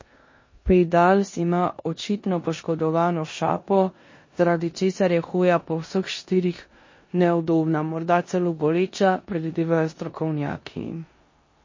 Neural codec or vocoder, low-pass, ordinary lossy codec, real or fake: codec, 16 kHz in and 24 kHz out, 0.9 kbps, LongCat-Audio-Codec, four codebook decoder; 7.2 kHz; MP3, 32 kbps; fake